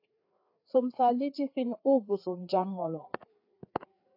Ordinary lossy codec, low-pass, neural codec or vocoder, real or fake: AAC, 48 kbps; 5.4 kHz; codec, 16 kHz, 4 kbps, FreqCodec, larger model; fake